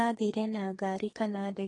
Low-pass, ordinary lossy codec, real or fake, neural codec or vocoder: 10.8 kHz; AAC, 32 kbps; fake; codec, 32 kHz, 1.9 kbps, SNAC